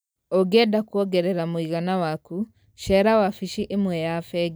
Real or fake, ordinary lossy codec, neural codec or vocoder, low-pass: real; none; none; none